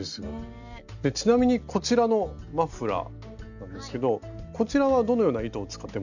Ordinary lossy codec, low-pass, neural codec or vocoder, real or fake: none; 7.2 kHz; none; real